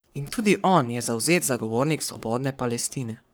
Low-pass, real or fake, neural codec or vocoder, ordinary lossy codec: none; fake; codec, 44.1 kHz, 3.4 kbps, Pupu-Codec; none